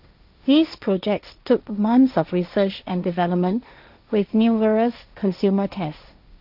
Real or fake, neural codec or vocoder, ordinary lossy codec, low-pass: fake; codec, 16 kHz, 1.1 kbps, Voila-Tokenizer; none; 5.4 kHz